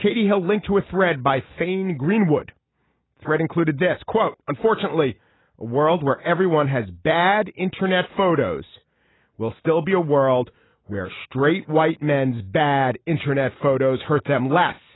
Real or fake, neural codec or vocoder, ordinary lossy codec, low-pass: real; none; AAC, 16 kbps; 7.2 kHz